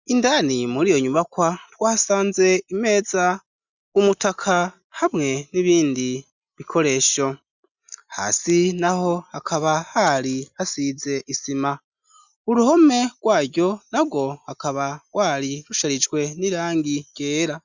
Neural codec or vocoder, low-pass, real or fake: none; 7.2 kHz; real